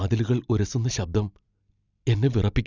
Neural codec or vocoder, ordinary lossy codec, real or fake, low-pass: none; none; real; 7.2 kHz